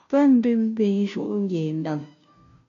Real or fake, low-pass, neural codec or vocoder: fake; 7.2 kHz; codec, 16 kHz, 0.5 kbps, FunCodec, trained on Chinese and English, 25 frames a second